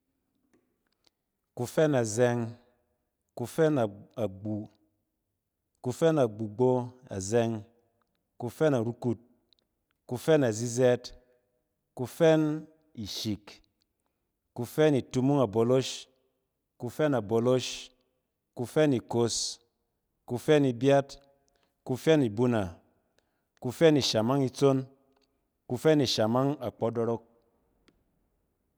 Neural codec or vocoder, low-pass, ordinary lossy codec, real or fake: none; none; none; real